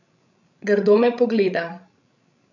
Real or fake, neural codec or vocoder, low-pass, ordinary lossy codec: fake; codec, 16 kHz, 16 kbps, FreqCodec, larger model; 7.2 kHz; none